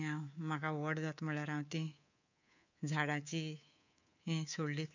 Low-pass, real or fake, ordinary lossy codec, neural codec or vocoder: 7.2 kHz; fake; none; codec, 24 kHz, 3.1 kbps, DualCodec